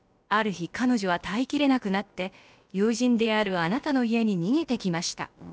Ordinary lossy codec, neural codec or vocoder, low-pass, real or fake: none; codec, 16 kHz, about 1 kbps, DyCAST, with the encoder's durations; none; fake